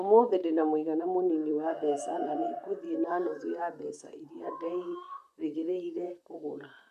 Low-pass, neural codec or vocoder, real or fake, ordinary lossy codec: 14.4 kHz; vocoder, 44.1 kHz, 128 mel bands, Pupu-Vocoder; fake; none